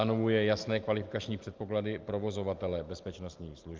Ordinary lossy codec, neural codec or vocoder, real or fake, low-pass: Opus, 32 kbps; none; real; 7.2 kHz